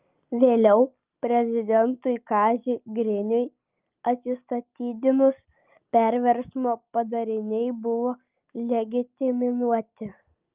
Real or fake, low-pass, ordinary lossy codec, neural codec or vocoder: real; 3.6 kHz; Opus, 24 kbps; none